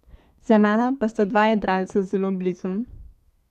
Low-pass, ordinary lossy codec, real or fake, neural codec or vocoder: 14.4 kHz; none; fake; codec, 32 kHz, 1.9 kbps, SNAC